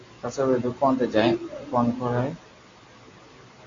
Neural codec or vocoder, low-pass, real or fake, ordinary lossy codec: none; 7.2 kHz; real; AAC, 32 kbps